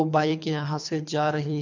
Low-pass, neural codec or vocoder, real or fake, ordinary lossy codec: 7.2 kHz; codec, 24 kHz, 3 kbps, HILCodec; fake; MP3, 64 kbps